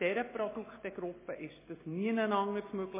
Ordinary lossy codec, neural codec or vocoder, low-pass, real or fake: MP3, 16 kbps; none; 3.6 kHz; real